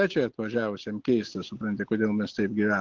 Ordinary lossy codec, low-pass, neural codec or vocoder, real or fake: Opus, 16 kbps; 7.2 kHz; none; real